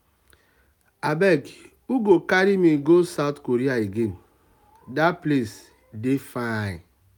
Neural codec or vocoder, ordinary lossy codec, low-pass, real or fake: none; none; 19.8 kHz; real